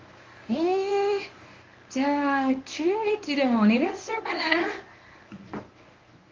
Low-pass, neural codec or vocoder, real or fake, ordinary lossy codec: 7.2 kHz; codec, 24 kHz, 0.9 kbps, WavTokenizer, medium speech release version 1; fake; Opus, 32 kbps